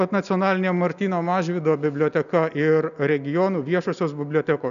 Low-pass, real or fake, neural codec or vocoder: 7.2 kHz; real; none